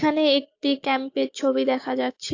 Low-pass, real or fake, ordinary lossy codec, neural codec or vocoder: 7.2 kHz; fake; AAC, 48 kbps; codec, 16 kHz, 6 kbps, DAC